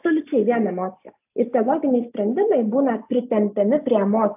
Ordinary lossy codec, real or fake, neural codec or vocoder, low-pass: AAC, 32 kbps; real; none; 3.6 kHz